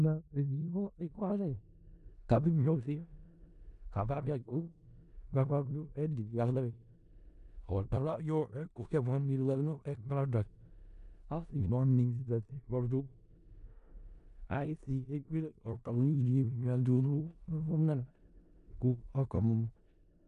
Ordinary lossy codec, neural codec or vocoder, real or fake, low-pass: MP3, 64 kbps; codec, 16 kHz in and 24 kHz out, 0.4 kbps, LongCat-Audio-Codec, four codebook decoder; fake; 10.8 kHz